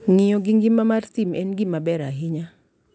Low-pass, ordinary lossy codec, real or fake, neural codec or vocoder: none; none; real; none